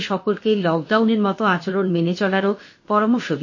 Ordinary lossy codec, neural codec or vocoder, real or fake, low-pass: MP3, 32 kbps; codec, 16 kHz, about 1 kbps, DyCAST, with the encoder's durations; fake; 7.2 kHz